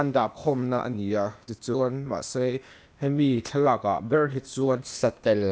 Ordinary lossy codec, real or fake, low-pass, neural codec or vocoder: none; fake; none; codec, 16 kHz, 0.8 kbps, ZipCodec